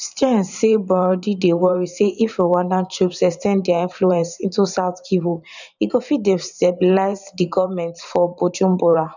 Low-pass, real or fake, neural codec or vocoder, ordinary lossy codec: 7.2 kHz; fake; vocoder, 44.1 kHz, 128 mel bands every 512 samples, BigVGAN v2; none